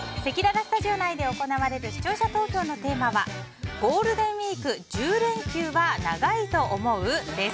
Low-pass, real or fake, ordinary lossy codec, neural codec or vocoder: none; real; none; none